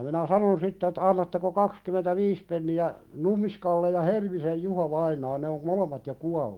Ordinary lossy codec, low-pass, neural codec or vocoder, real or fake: Opus, 24 kbps; 19.8 kHz; autoencoder, 48 kHz, 128 numbers a frame, DAC-VAE, trained on Japanese speech; fake